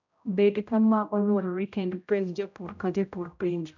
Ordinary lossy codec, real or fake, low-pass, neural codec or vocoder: none; fake; 7.2 kHz; codec, 16 kHz, 0.5 kbps, X-Codec, HuBERT features, trained on general audio